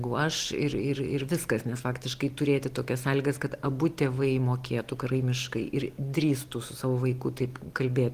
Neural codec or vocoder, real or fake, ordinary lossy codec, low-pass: none; real; Opus, 24 kbps; 14.4 kHz